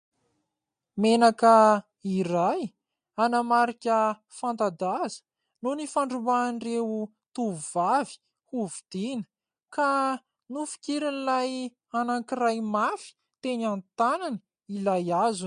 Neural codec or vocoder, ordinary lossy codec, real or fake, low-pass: none; MP3, 48 kbps; real; 9.9 kHz